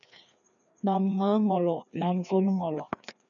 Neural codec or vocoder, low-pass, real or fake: codec, 16 kHz, 2 kbps, FreqCodec, larger model; 7.2 kHz; fake